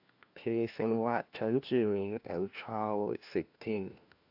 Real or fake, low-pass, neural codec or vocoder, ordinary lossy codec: fake; 5.4 kHz; codec, 16 kHz, 1 kbps, FunCodec, trained on LibriTTS, 50 frames a second; AAC, 48 kbps